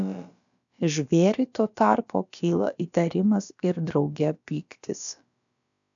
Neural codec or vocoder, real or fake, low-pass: codec, 16 kHz, about 1 kbps, DyCAST, with the encoder's durations; fake; 7.2 kHz